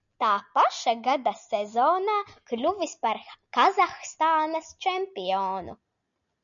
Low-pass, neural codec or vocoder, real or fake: 7.2 kHz; none; real